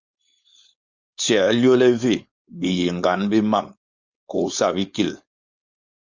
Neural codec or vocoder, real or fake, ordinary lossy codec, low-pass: codec, 16 kHz, 4.8 kbps, FACodec; fake; Opus, 64 kbps; 7.2 kHz